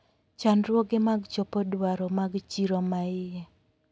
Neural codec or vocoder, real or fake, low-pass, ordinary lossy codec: none; real; none; none